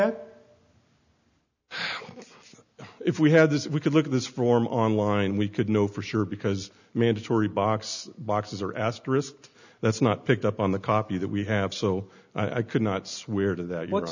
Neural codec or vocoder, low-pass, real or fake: none; 7.2 kHz; real